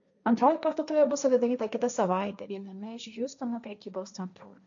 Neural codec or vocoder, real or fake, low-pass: codec, 16 kHz, 1.1 kbps, Voila-Tokenizer; fake; 7.2 kHz